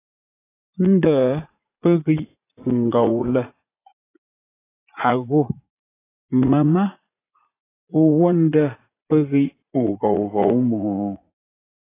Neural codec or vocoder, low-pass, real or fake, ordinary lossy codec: vocoder, 44.1 kHz, 80 mel bands, Vocos; 3.6 kHz; fake; AAC, 16 kbps